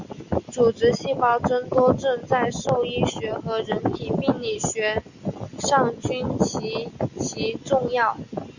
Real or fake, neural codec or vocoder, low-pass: real; none; 7.2 kHz